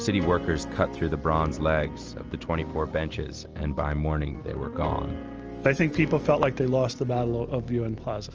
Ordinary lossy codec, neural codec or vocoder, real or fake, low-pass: Opus, 24 kbps; none; real; 7.2 kHz